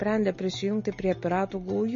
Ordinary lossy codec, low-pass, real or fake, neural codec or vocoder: MP3, 32 kbps; 7.2 kHz; real; none